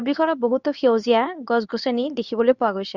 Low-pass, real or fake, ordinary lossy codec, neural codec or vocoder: 7.2 kHz; fake; none; codec, 24 kHz, 0.9 kbps, WavTokenizer, medium speech release version 2